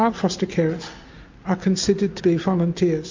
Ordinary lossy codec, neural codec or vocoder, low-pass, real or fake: MP3, 48 kbps; none; 7.2 kHz; real